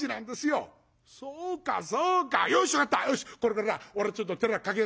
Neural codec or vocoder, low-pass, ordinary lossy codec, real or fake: none; none; none; real